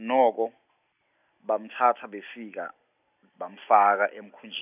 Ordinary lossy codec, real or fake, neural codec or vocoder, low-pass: none; real; none; 3.6 kHz